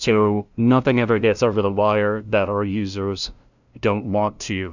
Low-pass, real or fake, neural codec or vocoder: 7.2 kHz; fake; codec, 16 kHz, 0.5 kbps, FunCodec, trained on LibriTTS, 25 frames a second